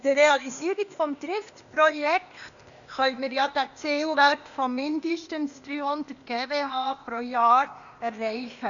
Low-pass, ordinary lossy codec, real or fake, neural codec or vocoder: 7.2 kHz; none; fake; codec, 16 kHz, 0.8 kbps, ZipCodec